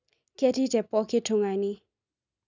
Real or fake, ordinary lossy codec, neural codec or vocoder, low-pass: real; none; none; 7.2 kHz